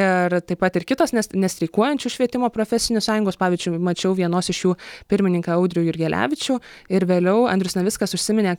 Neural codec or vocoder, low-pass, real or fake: none; 19.8 kHz; real